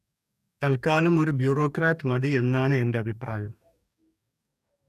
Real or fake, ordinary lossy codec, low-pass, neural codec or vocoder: fake; none; 14.4 kHz; codec, 44.1 kHz, 2.6 kbps, DAC